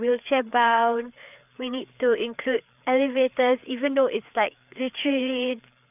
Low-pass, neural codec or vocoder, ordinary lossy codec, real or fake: 3.6 kHz; codec, 16 kHz, 4 kbps, FreqCodec, larger model; none; fake